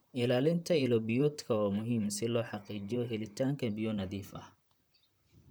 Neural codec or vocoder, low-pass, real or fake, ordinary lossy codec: vocoder, 44.1 kHz, 128 mel bands, Pupu-Vocoder; none; fake; none